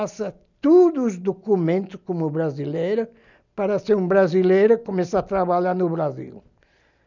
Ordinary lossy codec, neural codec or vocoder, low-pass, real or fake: none; none; 7.2 kHz; real